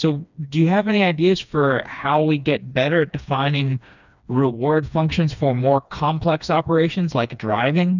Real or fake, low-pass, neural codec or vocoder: fake; 7.2 kHz; codec, 16 kHz, 2 kbps, FreqCodec, smaller model